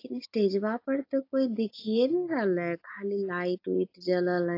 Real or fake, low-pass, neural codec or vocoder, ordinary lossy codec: real; 5.4 kHz; none; AAC, 32 kbps